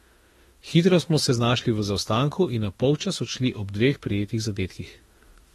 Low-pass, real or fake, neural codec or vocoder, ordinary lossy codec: 19.8 kHz; fake; autoencoder, 48 kHz, 32 numbers a frame, DAC-VAE, trained on Japanese speech; AAC, 32 kbps